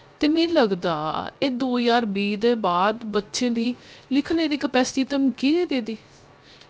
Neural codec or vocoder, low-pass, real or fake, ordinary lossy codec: codec, 16 kHz, 0.3 kbps, FocalCodec; none; fake; none